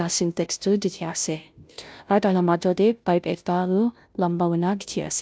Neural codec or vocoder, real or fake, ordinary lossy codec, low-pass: codec, 16 kHz, 0.5 kbps, FunCodec, trained on Chinese and English, 25 frames a second; fake; none; none